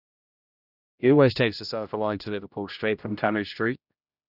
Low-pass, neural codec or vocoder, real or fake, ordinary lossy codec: 5.4 kHz; codec, 16 kHz, 0.5 kbps, X-Codec, HuBERT features, trained on general audio; fake; none